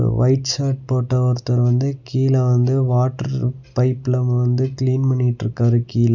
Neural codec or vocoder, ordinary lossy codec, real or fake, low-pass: none; none; real; 7.2 kHz